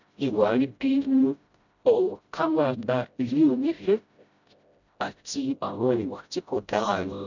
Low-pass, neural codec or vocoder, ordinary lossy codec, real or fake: 7.2 kHz; codec, 16 kHz, 0.5 kbps, FreqCodec, smaller model; none; fake